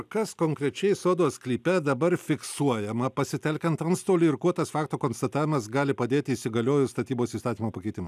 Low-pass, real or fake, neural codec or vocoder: 14.4 kHz; real; none